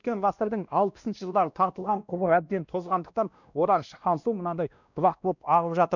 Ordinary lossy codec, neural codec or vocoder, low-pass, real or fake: none; codec, 16 kHz, 1 kbps, X-Codec, WavLM features, trained on Multilingual LibriSpeech; 7.2 kHz; fake